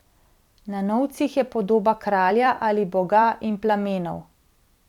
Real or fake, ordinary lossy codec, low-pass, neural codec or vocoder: fake; none; 19.8 kHz; vocoder, 44.1 kHz, 128 mel bands every 256 samples, BigVGAN v2